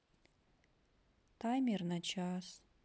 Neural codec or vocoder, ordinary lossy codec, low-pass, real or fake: none; none; none; real